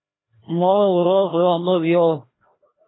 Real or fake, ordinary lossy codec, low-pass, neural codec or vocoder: fake; AAC, 16 kbps; 7.2 kHz; codec, 16 kHz, 1 kbps, FreqCodec, larger model